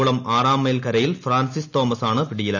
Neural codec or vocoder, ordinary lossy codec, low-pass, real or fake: none; none; 7.2 kHz; real